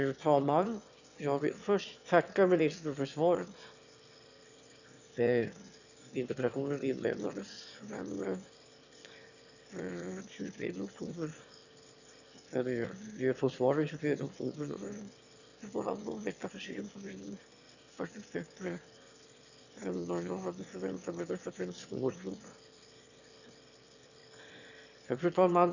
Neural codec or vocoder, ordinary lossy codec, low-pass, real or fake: autoencoder, 22.05 kHz, a latent of 192 numbers a frame, VITS, trained on one speaker; none; 7.2 kHz; fake